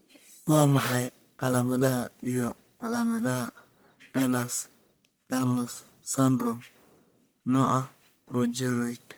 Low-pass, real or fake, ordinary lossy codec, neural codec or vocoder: none; fake; none; codec, 44.1 kHz, 1.7 kbps, Pupu-Codec